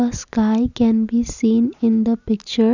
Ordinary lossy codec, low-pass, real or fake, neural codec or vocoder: none; 7.2 kHz; real; none